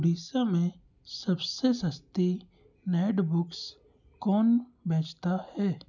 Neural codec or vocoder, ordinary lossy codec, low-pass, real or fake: none; none; 7.2 kHz; real